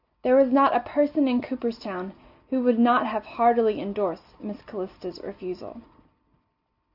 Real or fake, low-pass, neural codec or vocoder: real; 5.4 kHz; none